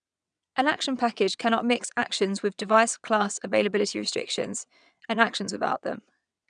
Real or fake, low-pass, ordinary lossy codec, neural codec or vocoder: fake; 9.9 kHz; none; vocoder, 22.05 kHz, 80 mel bands, WaveNeXt